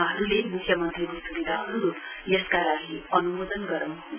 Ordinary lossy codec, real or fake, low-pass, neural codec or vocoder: none; real; 3.6 kHz; none